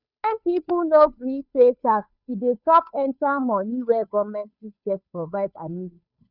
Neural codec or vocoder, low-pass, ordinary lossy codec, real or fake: codec, 16 kHz, 2 kbps, FunCodec, trained on Chinese and English, 25 frames a second; 5.4 kHz; none; fake